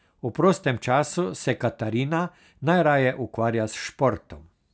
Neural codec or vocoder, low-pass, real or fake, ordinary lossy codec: none; none; real; none